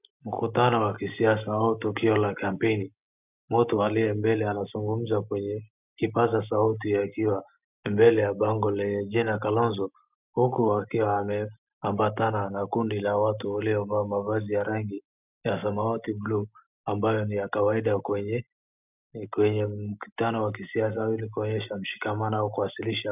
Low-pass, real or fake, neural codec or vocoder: 3.6 kHz; real; none